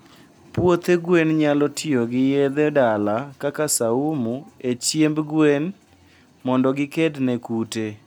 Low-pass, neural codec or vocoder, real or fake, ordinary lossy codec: none; none; real; none